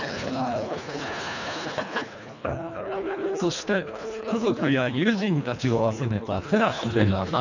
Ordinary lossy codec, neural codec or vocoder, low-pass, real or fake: none; codec, 24 kHz, 1.5 kbps, HILCodec; 7.2 kHz; fake